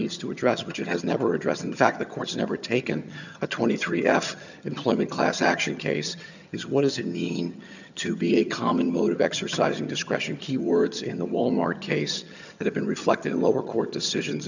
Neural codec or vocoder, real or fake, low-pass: vocoder, 22.05 kHz, 80 mel bands, HiFi-GAN; fake; 7.2 kHz